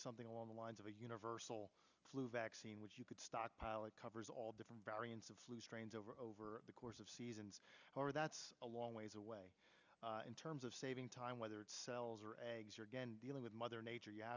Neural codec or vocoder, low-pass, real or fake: none; 7.2 kHz; real